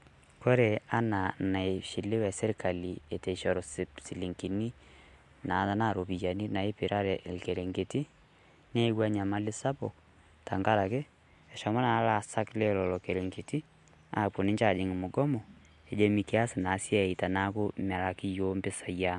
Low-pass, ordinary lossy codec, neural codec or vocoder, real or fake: 10.8 kHz; MP3, 64 kbps; none; real